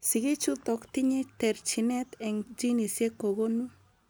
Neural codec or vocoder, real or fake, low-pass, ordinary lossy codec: none; real; none; none